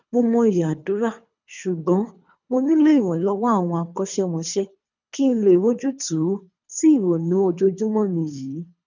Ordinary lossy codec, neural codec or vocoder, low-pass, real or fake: none; codec, 24 kHz, 3 kbps, HILCodec; 7.2 kHz; fake